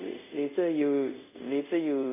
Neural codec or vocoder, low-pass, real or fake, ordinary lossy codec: codec, 24 kHz, 0.5 kbps, DualCodec; 3.6 kHz; fake; none